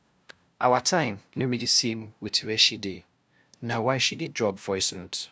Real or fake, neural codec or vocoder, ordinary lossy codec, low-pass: fake; codec, 16 kHz, 0.5 kbps, FunCodec, trained on LibriTTS, 25 frames a second; none; none